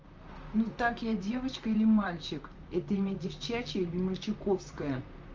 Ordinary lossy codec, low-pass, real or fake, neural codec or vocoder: Opus, 24 kbps; 7.2 kHz; fake; vocoder, 44.1 kHz, 128 mel bands, Pupu-Vocoder